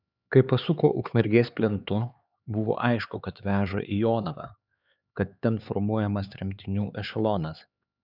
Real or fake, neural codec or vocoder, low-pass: fake; codec, 16 kHz, 4 kbps, X-Codec, HuBERT features, trained on LibriSpeech; 5.4 kHz